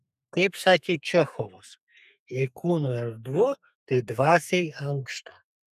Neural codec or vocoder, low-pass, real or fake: codec, 32 kHz, 1.9 kbps, SNAC; 14.4 kHz; fake